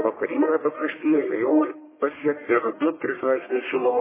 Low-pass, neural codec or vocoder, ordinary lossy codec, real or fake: 3.6 kHz; codec, 44.1 kHz, 1.7 kbps, Pupu-Codec; MP3, 16 kbps; fake